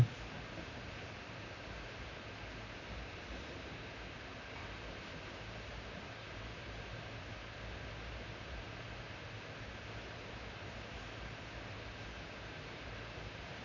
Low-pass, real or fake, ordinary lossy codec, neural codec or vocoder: 7.2 kHz; fake; none; vocoder, 44.1 kHz, 128 mel bands, Pupu-Vocoder